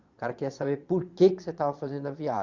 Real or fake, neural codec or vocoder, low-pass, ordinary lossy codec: fake; vocoder, 22.05 kHz, 80 mel bands, Vocos; 7.2 kHz; Opus, 64 kbps